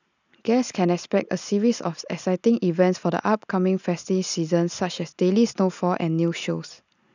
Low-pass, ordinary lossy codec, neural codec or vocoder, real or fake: 7.2 kHz; none; none; real